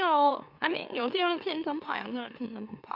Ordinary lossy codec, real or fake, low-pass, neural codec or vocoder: none; fake; 5.4 kHz; autoencoder, 44.1 kHz, a latent of 192 numbers a frame, MeloTTS